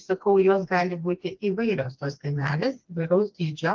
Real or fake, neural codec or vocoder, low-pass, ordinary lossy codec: fake; codec, 16 kHz, 2 kbps, FreqCodec, smaller model; 7.2 kHz; Opus, 24 kbps